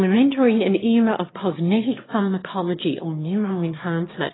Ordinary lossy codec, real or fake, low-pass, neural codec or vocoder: AAC, 16 kbps; fake; 7.2 kHz; autoencoder, 22.05 kHz, a latent of 192 numbers a frame, VITS, trained on one speaker